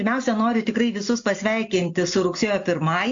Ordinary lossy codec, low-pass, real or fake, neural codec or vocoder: AAC, 64 kbps; 7.2 kHz; real; none